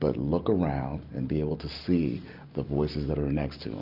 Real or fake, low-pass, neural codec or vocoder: real; 5.4 kHz; none